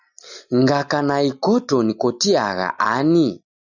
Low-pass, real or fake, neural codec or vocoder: 7.2 kHz; real; none